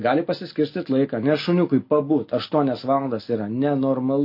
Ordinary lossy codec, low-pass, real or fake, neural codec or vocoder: MP3, 32 kbps; 5.4 kHz; real; none